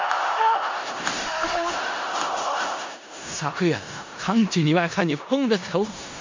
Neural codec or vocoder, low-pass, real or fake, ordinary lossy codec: codec, 16 kHz in and 24 kHz out, 0.4 kbps, LongCat-Audio-Codec, four codebook decoder; 7.2 kHz; fake; MP3, 48 kbps